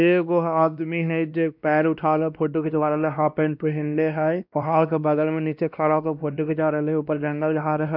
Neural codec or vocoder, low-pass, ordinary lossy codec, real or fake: codec, 16 kHz, 1 kbps, X-Codec, WavLM features, trained on Multilingual LibriSpeech; 5.4 kHz; none; fake